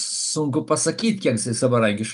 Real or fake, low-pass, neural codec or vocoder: real; 10.8 kHz; none